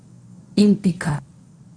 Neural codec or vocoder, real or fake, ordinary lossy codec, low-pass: codec, 24 kHz, 0.9 kbps, WavTokenizer, medium speech release version 1; fake; none; 9.9 kHz